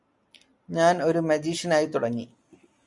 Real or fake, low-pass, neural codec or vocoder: real; 10.8 kHz; none